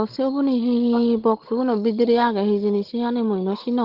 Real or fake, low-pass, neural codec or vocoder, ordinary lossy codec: fake; 5.4 kHz; codec, 16 kHz, 16 kbps, FunCodec, trained on Chinese and English, 50 frames a second; Opus, 16 kbps